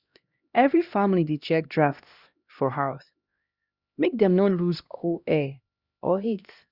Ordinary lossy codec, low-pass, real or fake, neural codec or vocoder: Opus, 64 kbps; 5.4 kHz; fake; codec, 16 kHz, 1 kbps, X-Codec, HuBERT features, trained on LibriSpeech